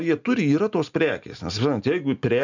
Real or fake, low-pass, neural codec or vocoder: real; 7.2 kHz; none